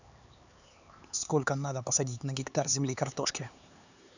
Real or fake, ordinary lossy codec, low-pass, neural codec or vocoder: fake; none; 7.2 kHz; codec, 16 kHz, 4 kbps, X-Codec, HuBERT features, trained on LibriSpeech